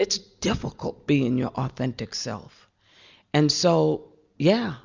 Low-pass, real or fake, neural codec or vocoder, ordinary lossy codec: 7.2 kHz; real; none; Opus, 64 kbps